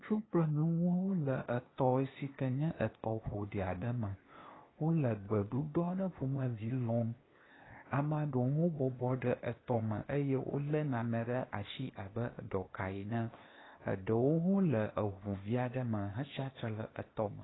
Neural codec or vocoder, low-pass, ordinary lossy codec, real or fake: codec, 16 kHz, 0.8 kbps, ZipCodec; 7.2 kHz; AAC, 16 kbps; fake